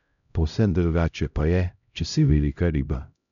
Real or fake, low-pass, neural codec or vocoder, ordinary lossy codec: fake; 7.2 kHz; codec, 16 kHz, 0.5 kbps, X-Codec, HuBERT features, trained on LibriSpeech; none